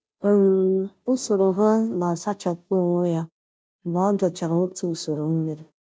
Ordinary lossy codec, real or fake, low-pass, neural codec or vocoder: none; fake; none; codec, 16 kHz, 0.5 kbps, FunCodec, trained on Chinese and English, 25 frames a second